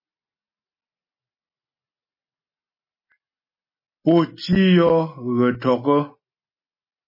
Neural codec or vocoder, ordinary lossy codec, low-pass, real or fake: none; MP3, 24 kbps; 5.4 kHz; real